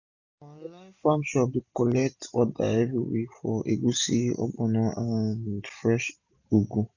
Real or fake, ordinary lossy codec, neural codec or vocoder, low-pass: real; none; none; 7.2 kHz